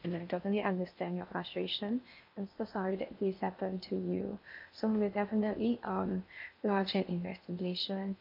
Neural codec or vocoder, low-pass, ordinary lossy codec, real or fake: codec, 16 kHz in and 24 kHz out, 0.6 kbps, FocalCodec, streaming, 2048 codes; 5.4 kHz; MP3, 48 kbps; fake